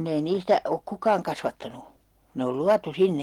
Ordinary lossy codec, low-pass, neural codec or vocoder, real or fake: Opus, 24 kbps; 19.8 kHz; none; real